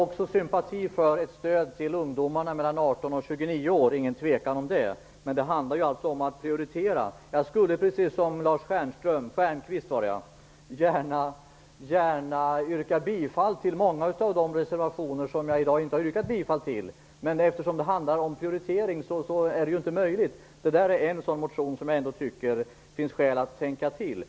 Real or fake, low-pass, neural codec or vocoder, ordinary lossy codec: real; none; none; none